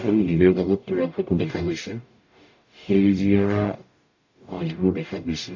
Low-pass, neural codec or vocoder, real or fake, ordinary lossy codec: 7.2 kHz; codec, 44.1 kHz, 0.9 kbps, DAC; fake; AAC, 48 kbps